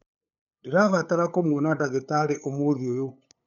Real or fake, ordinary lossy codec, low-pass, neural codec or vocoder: fake; MP3, 64 kbps; 7.2 kHz; codec, 16 kHz, 8 kbps, FunCodec, trained on LibriTTS, 25 frames a second